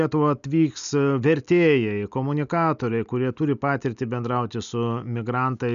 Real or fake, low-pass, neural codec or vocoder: real; 7.2 kHz; none